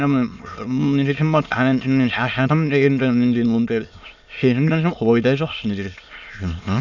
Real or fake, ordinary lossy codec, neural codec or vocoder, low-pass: fake; none; autoencoder, 22.05 kHz, a latent of 192 numbers a frame, VITS, trained on many speakers; 7.2 kHz